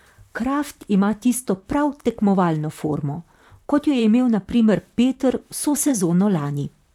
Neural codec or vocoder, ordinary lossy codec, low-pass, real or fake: vocoder, 44.1 kHz, 128 mel bands, Pupu-Vocoder; none; 19.8 kHz; fake